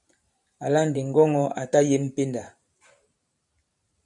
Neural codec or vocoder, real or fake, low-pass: vocoder, 44.1 kHz, 128 mel bands every 512 samples, BigVGAN v2; fake; 10.8 kHz